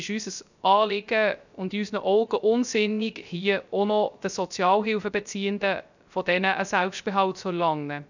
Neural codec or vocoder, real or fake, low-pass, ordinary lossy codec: codec, 16 kHz, 0.3 kbps, FocalCodec; fake; 7.2 kHz; none